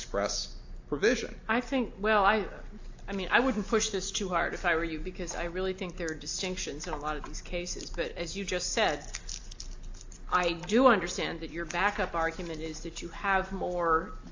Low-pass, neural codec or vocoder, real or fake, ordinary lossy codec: 7.2 kHz; none; real; AAC, 48 kbps